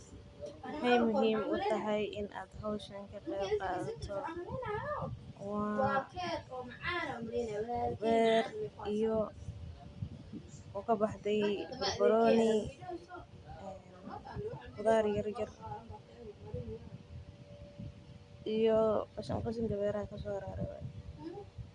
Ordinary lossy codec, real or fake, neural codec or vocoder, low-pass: Opus, 64 kbps; real; none; 10.8 kHz